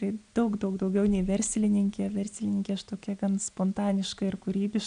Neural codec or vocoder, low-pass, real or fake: none; 9.9 kHz; real